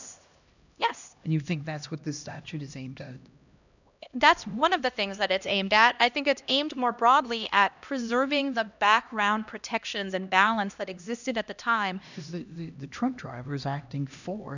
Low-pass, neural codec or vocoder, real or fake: 7.2 kHz; codec, 16 kHz, 1 kbps, X-Codec, HuBERT features, trained on LibriSpeech; fake